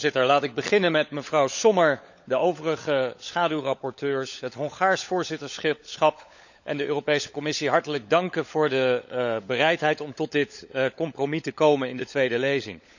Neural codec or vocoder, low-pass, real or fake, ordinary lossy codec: codec, 16 kHz, 16 kbps, FunCodec, trained on LibriTTS, 50 frames a second; 7.2 kHz; fake; none